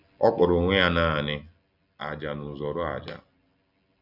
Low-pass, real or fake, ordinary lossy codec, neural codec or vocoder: 5.4 kHz; real; none; none